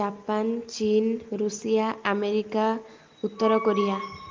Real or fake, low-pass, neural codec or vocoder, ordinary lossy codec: real; 7.2 kHz; none; Opus, 32 kbps